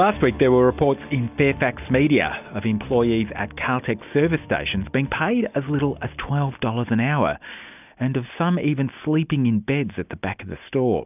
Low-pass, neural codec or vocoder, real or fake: 3.6 kHz; none; real